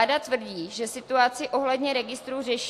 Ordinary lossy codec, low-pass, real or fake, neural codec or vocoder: AAC, 64 kbps; 14.4 kHz; real; none